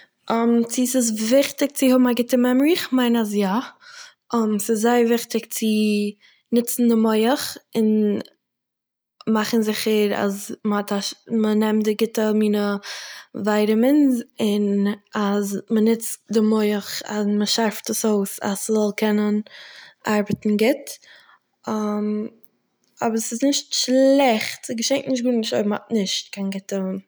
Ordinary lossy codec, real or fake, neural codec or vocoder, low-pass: none; real; none; none